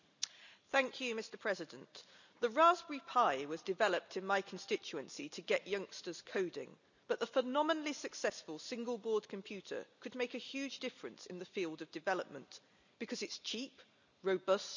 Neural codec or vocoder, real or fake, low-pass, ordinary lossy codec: none; real; 7.2 kHz; none